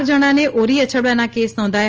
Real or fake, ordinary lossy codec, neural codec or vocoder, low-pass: real; Opus, 24 kbps; none; 7.2 kHz